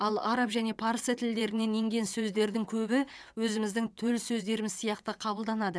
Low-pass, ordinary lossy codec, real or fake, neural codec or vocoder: none; none; fake; vocoder, 22.05 kHz, 80 mel bands, WaveNeXt